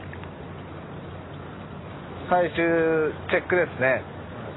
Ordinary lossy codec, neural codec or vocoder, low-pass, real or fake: AAC, 16 kbps; none; 7.2 kHz; real